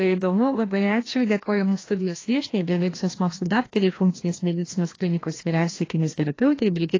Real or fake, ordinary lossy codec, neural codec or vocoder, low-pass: fake; AAC, 32 kbps; codec, 16 kHz, 1 kbps, FreqCodec, larger model; 7.2 kHz